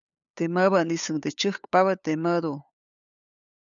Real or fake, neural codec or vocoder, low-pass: fake; codec, 16 kHz, 8 kbps, FunCodec, trained on LibriTTS, 25 frames a second; 7.2 kHz